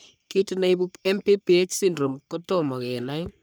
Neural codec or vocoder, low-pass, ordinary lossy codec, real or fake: codec, 44.1 kHz, 3.4 kbps, Pupu-Codec; none; none; fake